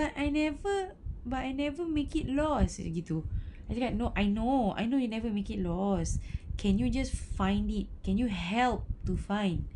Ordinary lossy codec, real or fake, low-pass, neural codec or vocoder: none; real; 10.8 kHz; none